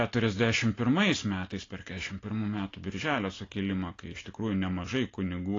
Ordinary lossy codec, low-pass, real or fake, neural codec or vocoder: AAC, 32 kbps; 7.2 kHz; real; none